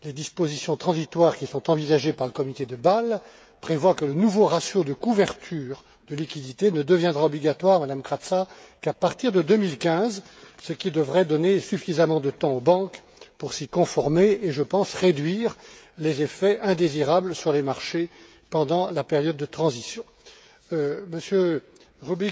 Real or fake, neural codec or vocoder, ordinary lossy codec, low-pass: fake; codec, 16 kHz, 16 kbps, FreqCodec, smaller model; none; none